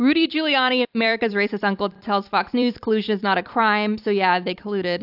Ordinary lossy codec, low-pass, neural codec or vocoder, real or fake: AAC, 48 kbps; 5.4 kHz; vocoder, 44.1 kHz, 128 mel bands every 256 samples, BigVGAN v2; fake